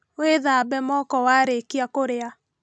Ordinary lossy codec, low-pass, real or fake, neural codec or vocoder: none; none; real; none